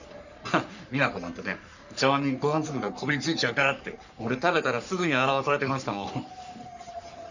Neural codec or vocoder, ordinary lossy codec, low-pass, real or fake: codec, 44.1 kHz, 3.4 kbps, Pupu-Codec; none; 7.2 kHz; fake